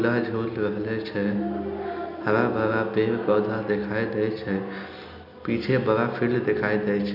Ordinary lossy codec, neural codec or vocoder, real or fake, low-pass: none; none; real; 5.4 kHz